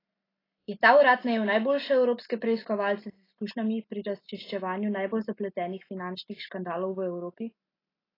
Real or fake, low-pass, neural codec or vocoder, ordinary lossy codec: real; 5.4 kHz; none; AAC, 24 kbps